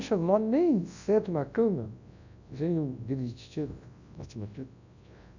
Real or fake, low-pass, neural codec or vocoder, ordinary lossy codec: fake; 7.2 kHz; codec, 24 kHz, 0.9 kbps, WavTokenizer, large speech release; none